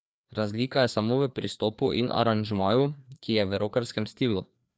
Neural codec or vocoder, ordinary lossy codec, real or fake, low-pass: codec, 16 kHz, 2 kbps, FreqCodec, larger model; none; fake; none